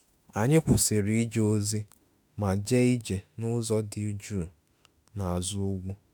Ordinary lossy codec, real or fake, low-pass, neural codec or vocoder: none; fake; none; autoencoder, 48 kHz, 32 numbers a frame, DAC-VAE, trained on Japanese speech